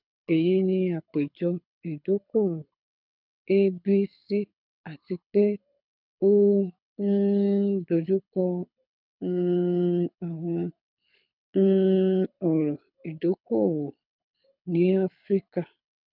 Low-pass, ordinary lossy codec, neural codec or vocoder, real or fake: 5.4 kHz; none; codec, 24 kHz, 6 kbps, HILCodec; fake